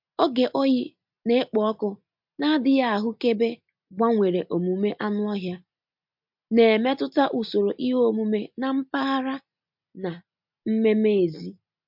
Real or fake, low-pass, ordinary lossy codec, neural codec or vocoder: real; 5.4 kHz; MP3, 48 kbps; none